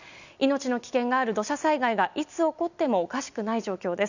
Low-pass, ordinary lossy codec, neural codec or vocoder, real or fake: 7.2 kHz; none; none; real